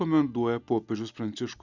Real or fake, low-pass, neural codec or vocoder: real; 7.2 kHz; none